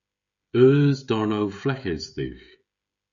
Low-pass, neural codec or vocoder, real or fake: 7.2 kHz; codec, 16 kHz, 16 kbps, FreqCodec, smaller model; fake